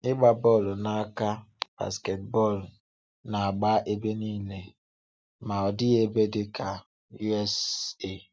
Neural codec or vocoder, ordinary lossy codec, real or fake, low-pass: none; none; real; none